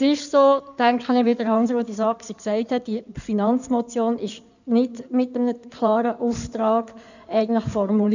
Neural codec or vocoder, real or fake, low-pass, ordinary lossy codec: codec, 16 kHz in and 24 kHz out, 2.2 kbps, FireRedTTS-2 codec; fake; 7.2 kHz; none